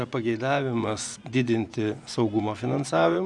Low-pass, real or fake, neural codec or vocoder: 10.8 kHz; fake; vocoder, 24 kHz, 100 mel bands, Vocos